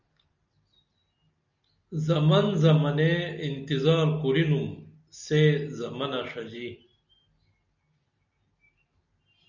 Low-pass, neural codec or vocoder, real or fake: 7.2 kHz; none; real